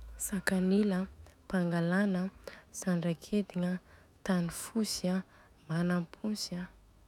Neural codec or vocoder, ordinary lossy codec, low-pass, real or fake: autoencoder, 48 kHz, 128 numbers a frame, DAC-VAE, trained on Japanese speech; none; 19.8 kHz; fake